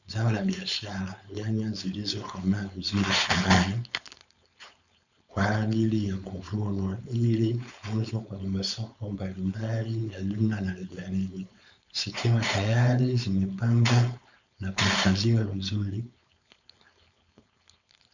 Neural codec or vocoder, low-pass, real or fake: codec, 16 kHz, 4.8 kbps, FACodec; 7.2 kHz; fake